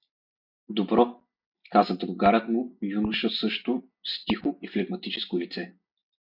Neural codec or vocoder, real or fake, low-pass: none; real; 5.4 kHz